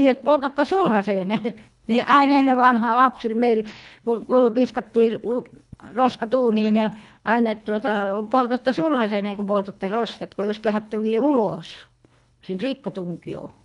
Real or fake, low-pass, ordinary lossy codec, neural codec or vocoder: fake; 10.8 kHz; none; codec, 24 kHz, 1.5 kbps, HILCodec